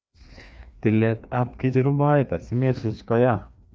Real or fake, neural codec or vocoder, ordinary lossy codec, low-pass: fake; codec, 16 kHz, 2 kbps, FreqCodec, larger model; none; none